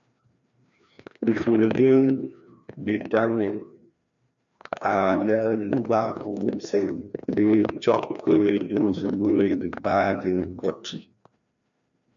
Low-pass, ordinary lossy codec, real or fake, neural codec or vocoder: 7.2 kHz; MP3, 96 kbps; fake; codec, 16 kHz, 1 kbps, FreqCodec, larger model